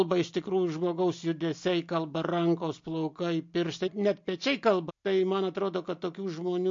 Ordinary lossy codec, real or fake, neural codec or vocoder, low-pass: MP3, 48 kbps; real; none; 7.2 kHz